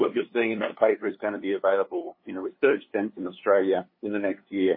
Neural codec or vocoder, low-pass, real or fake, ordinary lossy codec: codec, 16 kHz, 2 kbps, FunCodec, trained on LibriTTS, 25 frames a second; 5.4 kHz; fake; MP3, 24 kbps